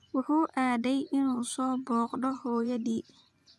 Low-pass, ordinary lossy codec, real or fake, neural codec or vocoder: none; none; fake; vocoder, 24 kHz, 100 mel bands, Vocos